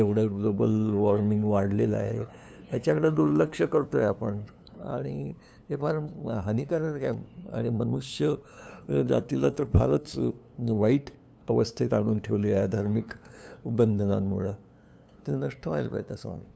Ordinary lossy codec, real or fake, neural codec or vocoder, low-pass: none; fake; codec, 16 kHz, 2 kbps, FunCodec, trained on LibriTTS, 25 frames a second; none